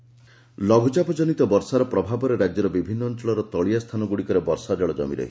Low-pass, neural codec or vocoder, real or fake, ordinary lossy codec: none; none; real; none